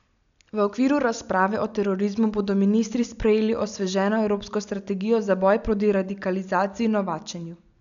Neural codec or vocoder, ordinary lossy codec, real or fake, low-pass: none; none; real; 7.2 kHz